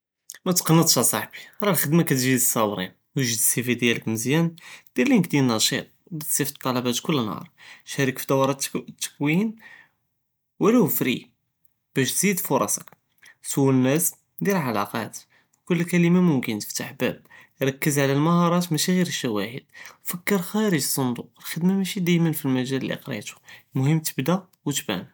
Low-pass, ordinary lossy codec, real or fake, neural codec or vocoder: none; none; real; none